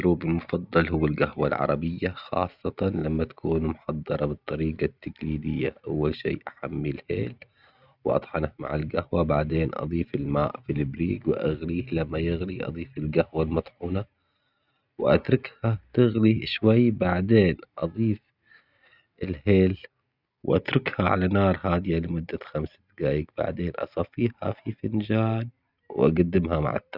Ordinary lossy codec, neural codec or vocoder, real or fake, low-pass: none; none; real; 5.4 kHz